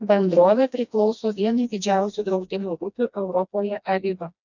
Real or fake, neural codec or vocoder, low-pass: fake; codec, 16 kHz, 1 kbps, FreqCodec, smaller model; 7.2 kHz